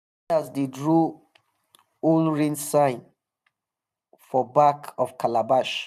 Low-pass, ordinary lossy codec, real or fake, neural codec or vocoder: 14.4 kHz; none; fake; vocoder, 44.1 kHz, 128 mel bands every 512 samples, BigVGAN v2